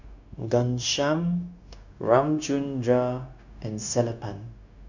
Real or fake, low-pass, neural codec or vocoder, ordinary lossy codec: fake; 7.2 kHz; codec, 16 kHz, 0.9 kbps, LongCat-Audio-Codec; none